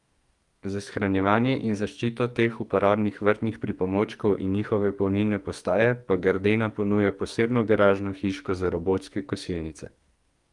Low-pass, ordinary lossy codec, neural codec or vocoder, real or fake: 10.8 kHz; Opus, 32 kbps; codec, 44.1 kHz, 2.6 kbps, SNAC; fake